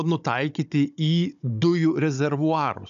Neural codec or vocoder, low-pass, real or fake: codec, 16 kHz, 16 kbps, FunCodec, trained on Chinese and English, 50 frames a second; 7.2 kHz; fake